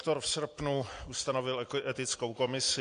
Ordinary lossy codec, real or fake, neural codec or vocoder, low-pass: AAC, 48 kbps; real; none; 9.9 kHz